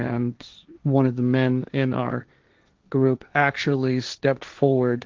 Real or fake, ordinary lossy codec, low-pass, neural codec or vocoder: fake; Opus, 24 kbps; 7.2 kHz; codec, 16 kHz, 1.1 kbps, Voila-Tokenizer